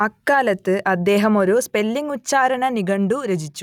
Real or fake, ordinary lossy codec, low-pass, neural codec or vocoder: real; none; 19.8 kHz; none